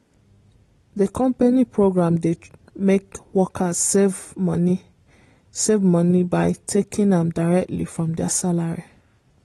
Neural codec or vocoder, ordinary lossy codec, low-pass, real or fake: none; AAC, 32 kbps; 19.8 kHz; real